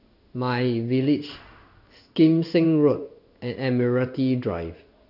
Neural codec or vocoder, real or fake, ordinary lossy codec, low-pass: codec, 16 kHz in and 24 kHz out, 1 kbps, XY-Tokenizer; fake; none; 5.4 kHz